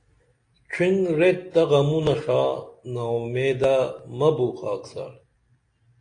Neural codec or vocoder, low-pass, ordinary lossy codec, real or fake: none; 9.9 kHz; AAC, 48 kbps; real